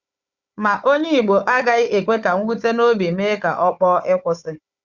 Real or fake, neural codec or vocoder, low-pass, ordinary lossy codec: fake; codec, 16 kHz, 4 kbps, FunCodec, trained on Chinese and English, 50 frames a second; 7.2 kHz; Opus, 64 kbps